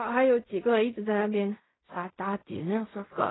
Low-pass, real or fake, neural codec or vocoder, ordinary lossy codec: 7.2 kHz; fake; codec, 16 kHz in and 24 kHz out, 0.4 kbps, LongCat-Audio-Codec, fine tuned four codebook decoder; AAC, 16 kbps